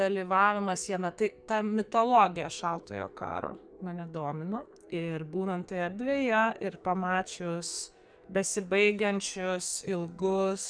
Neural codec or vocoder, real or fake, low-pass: codec, 44.1 kHz, 2.6 kbps, SNAC; fake; 9.9 kHz